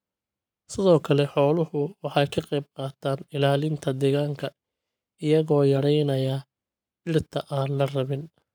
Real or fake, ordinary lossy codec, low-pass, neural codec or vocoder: fake; none; none; codec, 44.1 kHz, 7.8 kbps, Pupu-Codec